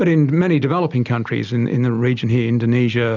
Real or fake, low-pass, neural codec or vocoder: real; 7.2 kHz; none